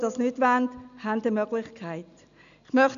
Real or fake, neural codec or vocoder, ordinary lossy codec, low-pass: real; none; MP3, 96 kbps; 7.2 kHz